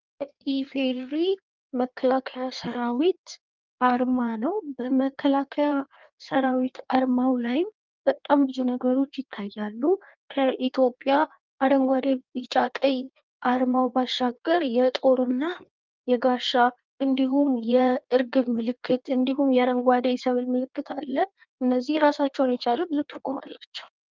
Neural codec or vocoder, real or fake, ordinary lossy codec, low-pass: codec, 16 kHz in and 24 kHz out, 1.1 kbps, FireRedTTS-2 codec; fake; Opus, 32 kbps; 7.2 kHz